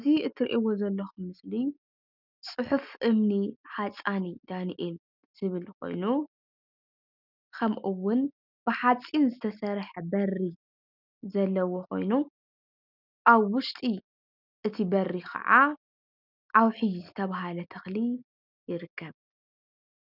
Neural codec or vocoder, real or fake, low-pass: none; real; 5.4 kHz